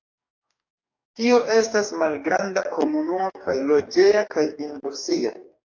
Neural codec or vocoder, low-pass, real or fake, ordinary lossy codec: codec, 44.1 kHz, 2.6 kbps, DAC; 7.2 kHz; fake; AAC, 48 kbps